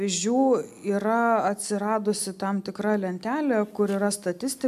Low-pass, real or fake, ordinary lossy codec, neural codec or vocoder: 14.4 kHz; real; AAC, 96 kbps; none